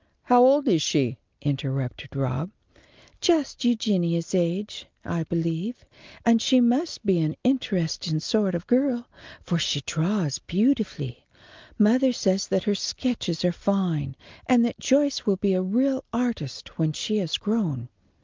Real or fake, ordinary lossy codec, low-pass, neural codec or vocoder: real; Opus, 24 kbps; 7.2 kHz; none